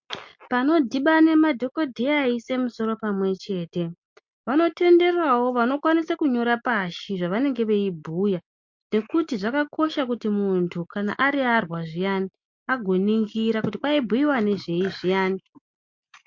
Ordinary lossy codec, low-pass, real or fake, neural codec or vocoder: MP3, 48 kbps; 7.2 kHz; real; none